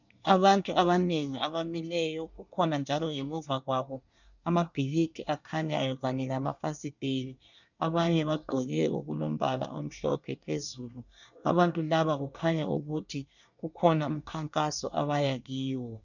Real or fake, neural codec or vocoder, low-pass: fake; codec, 24 kHz, 1 kbps, SNAC; 7.2 kHz